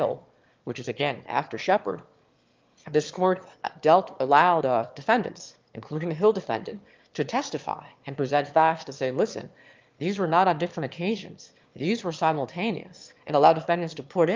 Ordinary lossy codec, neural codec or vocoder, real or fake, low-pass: Opus, 32 kbps; autoencoder, 22.05 kHz, a latent of 192 numbers a frame, VITS, trained on one speaker; fake; 7.2 kHz